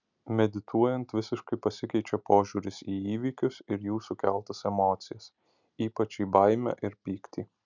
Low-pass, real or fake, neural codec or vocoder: 7.2 kHz; real; none